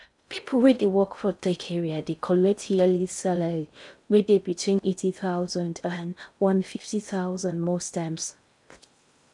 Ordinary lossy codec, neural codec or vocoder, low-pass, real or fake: none; codec, 16 kHz in and 24 kHz out, 0.6 kbps, FocalCodec, streaming, 4096 codes; 10.8 kHz; fake